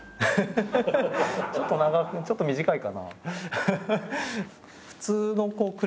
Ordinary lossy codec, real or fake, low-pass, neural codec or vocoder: none; real; none; none